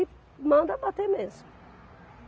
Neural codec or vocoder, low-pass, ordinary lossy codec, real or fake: none; none; none; real